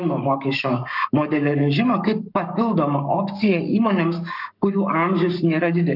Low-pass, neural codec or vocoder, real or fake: 5.4 kHz; codec, 44.1 kHz, 7.8 kbps, Pupu-Codec; fake